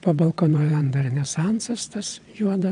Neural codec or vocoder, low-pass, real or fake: vocoder, 22.05 kHz, 80 mel bands, WaveNeXt; 9.9 kHz; fake